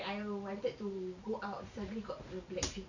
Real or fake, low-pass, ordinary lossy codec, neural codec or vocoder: fake; 7.2 kHz; none; vocoder, 44.1 kHz, 128 mel bands every 512 samples, BigVGAN v2